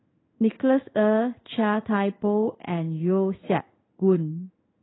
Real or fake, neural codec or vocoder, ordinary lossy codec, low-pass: fake; codec, 16 kHz in and 24 kHz out, 1 kbps, XY-Tokenizer; AAC, 16 kbps; 7.2 kHz